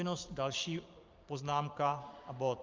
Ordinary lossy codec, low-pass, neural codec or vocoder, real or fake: Opus, 24 kbps; 7.2 kHz; vocoder, 44.1 kHz, 128 mel bands every 512 samples, BigVGAN v2; fake